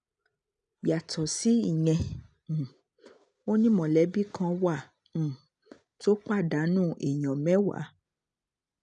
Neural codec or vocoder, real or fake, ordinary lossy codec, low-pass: none; real; none; 9.9 kHz